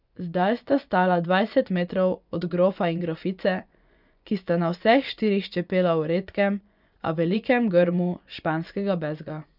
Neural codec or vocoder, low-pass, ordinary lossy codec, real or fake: vocoder, 24 kHz, 100 mel bands, Vocos; 5.4 kHz; none; fake